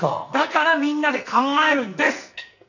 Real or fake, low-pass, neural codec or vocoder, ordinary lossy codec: fake; 7.2 kHz; codec, 44.1 kHz, 2.6 kbps, SNAC; none